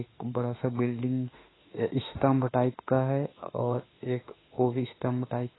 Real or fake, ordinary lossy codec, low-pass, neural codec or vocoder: fake; AAC, 16 kbps; 7.2 kHz; autoencoder, 48 kHz, 32 numbers a frame, DAC-VAE, trained on Japanese speech